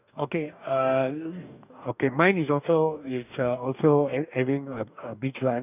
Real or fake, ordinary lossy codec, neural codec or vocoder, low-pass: fake; none; codec, 44.1 kHz, 2.6 kbps, DAC; 3.6 kHz